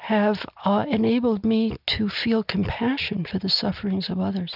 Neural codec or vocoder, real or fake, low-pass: none; real; 5.4 kHz